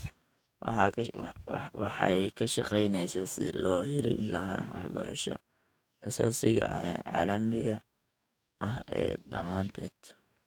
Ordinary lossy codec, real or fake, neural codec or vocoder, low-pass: none; fake; codec, 44.1 kHz, 2.6 kbps, DAC; 19.8 kHz